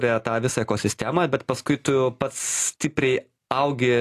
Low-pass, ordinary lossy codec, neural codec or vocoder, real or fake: 14.4 kHz; AAC, 64 kbps; none; real